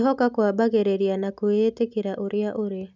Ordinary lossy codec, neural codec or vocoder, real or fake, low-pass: none; none; real; 7.2 kHz